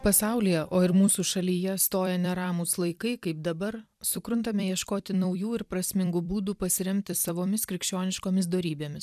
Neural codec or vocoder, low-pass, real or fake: vocoder, 44.1 kHz, 128 mel bands every 256 samples, BigVGAN v2; 14.4 kHz; fake